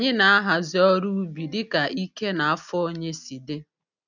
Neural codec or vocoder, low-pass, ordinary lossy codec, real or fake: none; 7.2 kHz; none; real